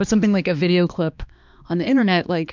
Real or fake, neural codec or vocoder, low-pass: fake; codec, 16 kHz, 2 kbps, X-Codec, HuBERT features, trained on balanced general audio; 7.2 kHz